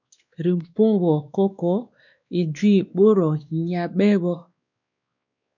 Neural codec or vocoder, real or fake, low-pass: codec, 16 kHz, 2 kbps, X-Codec, WavLM features, trained on Multilingual LibriSpeech; fake; 7.2 kHz